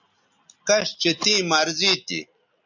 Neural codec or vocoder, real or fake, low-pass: none; real; 7.2 kHz